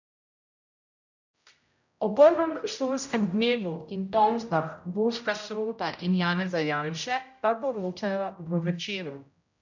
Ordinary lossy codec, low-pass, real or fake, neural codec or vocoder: none; 7.2 kHz; fake; codec, 16 kHz, 0.5 kbps, X-Codec, HuBERT features, trained on general audio